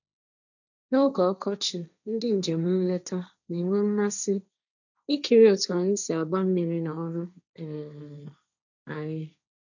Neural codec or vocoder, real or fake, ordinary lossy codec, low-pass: codec, 16 kHz, 1.1 kbps, Voila-Tokenizer; fake; none; 7.2 kHz